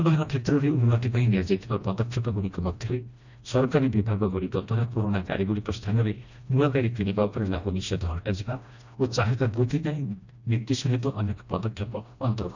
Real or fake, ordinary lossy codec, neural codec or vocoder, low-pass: fake; none; codec, 16 kHz, 1 kbps, FreqCodec, smaller model; 7.2 kHz